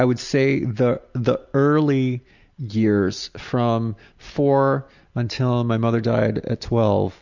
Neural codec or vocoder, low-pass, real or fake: none; 7.2 kHz; real